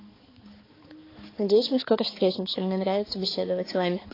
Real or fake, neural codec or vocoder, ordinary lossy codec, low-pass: fake; codec, 16 kHz, 4 kbps, X-Codec, HuBERT features, trained on balanced general audio; AAC, 24 kbps; 5.4 kHz